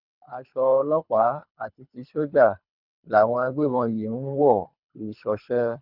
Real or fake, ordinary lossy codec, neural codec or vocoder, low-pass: fake; none; codec, 24 kHz, 3 kbps, HILCodec; 5.4 kHz